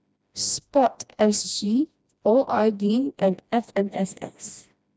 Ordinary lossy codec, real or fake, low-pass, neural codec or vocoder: none; fake; none; codec, 16 kHz, 1 kbps, FreqCodec, smaller model